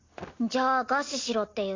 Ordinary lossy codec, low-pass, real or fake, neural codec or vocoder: AAC, 32 kbps; 7.2 kHz; real; none